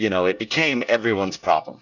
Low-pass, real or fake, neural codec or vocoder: 7.2 kHz; fake; codec, 24 kHz, 1 kbps, SNAC